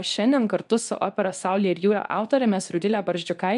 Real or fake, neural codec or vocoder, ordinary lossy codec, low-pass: fake; codec, 24 kHz, 0.9 kbps, WavTokenizer, medium speech release version 2; AAC, 96 kbps; 10.8 kHz